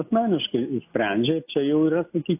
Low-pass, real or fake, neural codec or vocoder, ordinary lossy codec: 3.6 kHz; real; none; AAC, 32 kbps